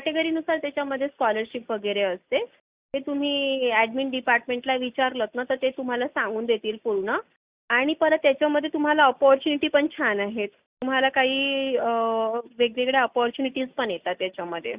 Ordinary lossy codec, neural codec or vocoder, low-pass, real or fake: Opus, 64 kbps; none; 3.6 kHz; real